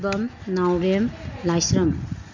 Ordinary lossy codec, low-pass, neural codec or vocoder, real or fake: MP3, 64 kbps; 7.2 kHz; none; real